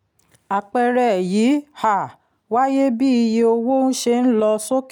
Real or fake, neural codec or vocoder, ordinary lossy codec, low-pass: real; none; none; 19.8 kHz